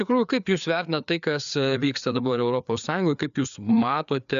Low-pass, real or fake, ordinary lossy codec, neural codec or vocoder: 7.2 kHz; fake; MP3, 96 kbps; codec, 16 kHz, 4 kbps, FreqCodec, larger model